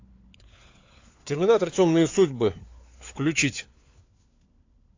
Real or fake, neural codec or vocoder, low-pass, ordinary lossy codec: fake; codec, 16 kHz, 4 kbps, FunCodec, trained on LibriTTS, 50 frames a second; 7.2 kHz; AAC, 48 kbps